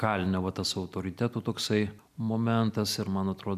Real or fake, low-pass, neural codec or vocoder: real; 14.4 kHz; none